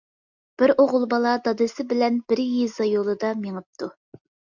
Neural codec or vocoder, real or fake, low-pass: none; real; 7.2 kHz